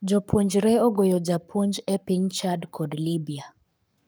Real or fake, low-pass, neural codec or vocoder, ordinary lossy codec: fake; none; codec, 44.1 kHz, 7.8 kbps, Pupu-Codec; none